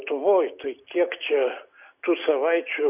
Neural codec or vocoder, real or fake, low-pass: none; real; 3.6 kHz